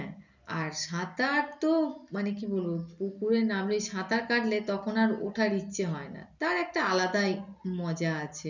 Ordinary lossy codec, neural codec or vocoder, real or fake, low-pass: none; none; real; 7.2 kHz